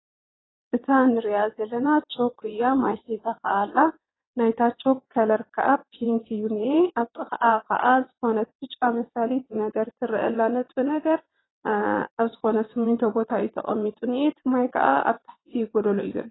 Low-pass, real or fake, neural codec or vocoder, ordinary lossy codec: 7.2 kHz; fake; vocoder, 22.05 kHz, 80 mel bands, WaveNeXt; AAC, 16 kbps